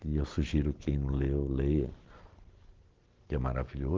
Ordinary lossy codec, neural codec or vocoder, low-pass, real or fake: Opus, 16 kbps; none; 7.2 kHz; real